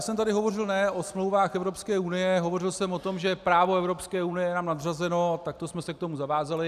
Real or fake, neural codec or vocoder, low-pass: real; none; 14.4 kHz